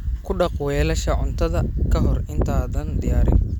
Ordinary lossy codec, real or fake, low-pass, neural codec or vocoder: none; real; 19.8 kHz; none